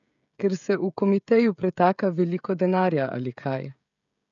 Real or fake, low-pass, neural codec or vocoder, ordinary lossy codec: fake; 7.2 kHz; codec, 16 kHz, 16 kbps, FreqCodec, smaller model; none